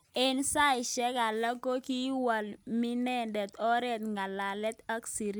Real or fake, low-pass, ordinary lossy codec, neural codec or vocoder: real; none; none; none